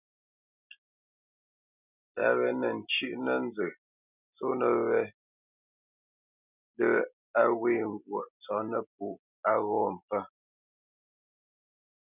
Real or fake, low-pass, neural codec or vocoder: real; 3.6 kHz; none